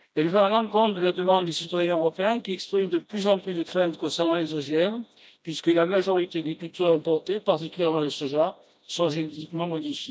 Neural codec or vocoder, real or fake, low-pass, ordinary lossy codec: codec, 16 kHz, 1 kbps, FreqCodec, smaller model; fake; none; none